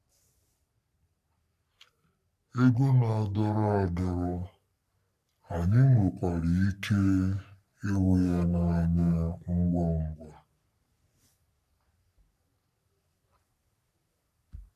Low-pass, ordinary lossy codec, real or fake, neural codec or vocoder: 14.4 kHz; none; fake; codec, 44.1 kHz, 3.4 kbps, Pupu-Codec